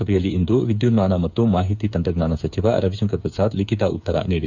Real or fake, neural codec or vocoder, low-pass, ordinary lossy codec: fake; codec, 16 kHz, 8 kbps, FreqCodec, smaller model; 7.2 kHz; none